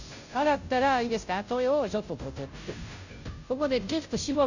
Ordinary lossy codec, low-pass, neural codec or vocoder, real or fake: none; 7.2 kHz; codec, 16 kHz, 0.5 kbps, FunCodec, trained on Chinese and English, 25 frames a second; fake